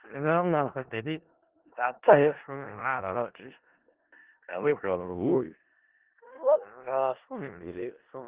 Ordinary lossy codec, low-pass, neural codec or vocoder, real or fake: Opus, 16 kbps; 3.6 kHz; codec, 16 kHz in and 24 kHz out, 0.4 kbps, LongCat-Audio-Codec, four codebook decoder; fake